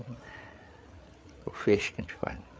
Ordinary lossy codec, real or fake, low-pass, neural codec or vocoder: none; fake; none; codec, 16 kHz, 8 kbps, FreqCodec, larger model